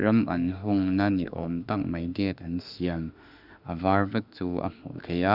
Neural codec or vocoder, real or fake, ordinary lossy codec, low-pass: autoencoder, 48 kHz, 32 numbers a frame, DAC-VAE, trained on Japanese speech; fake; none; 5.4 kHz